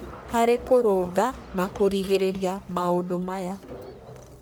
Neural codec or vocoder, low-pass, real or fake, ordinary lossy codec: codec, 44.1 kHz, 1.7 kbps, Pupu-Codec; none; fake; none